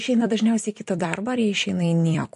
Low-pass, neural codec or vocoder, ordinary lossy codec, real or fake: 14.4 kHz; vocoder, 44.1 kHz, 128 mel bands every 256 samples, BigVGAN v2; MP3, 48 kbps; fake